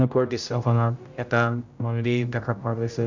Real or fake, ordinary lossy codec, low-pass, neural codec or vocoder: fake; none; 7.2 kHz; codec, 16 kHz, 0.5 kbps, X-Codec, HuBERT features, trained on general audio